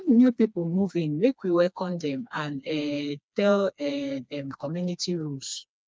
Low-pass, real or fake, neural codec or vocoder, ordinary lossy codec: none; fake; codec, 16 kHz, 2 kbps, FreqCodec, smaller model; none